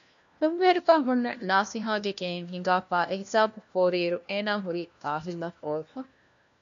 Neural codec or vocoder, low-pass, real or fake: codec, 16 kHz, 1 kbps, FunCodec, trained on LibriTTS, 50 frames a second; 7.2 kHz; fake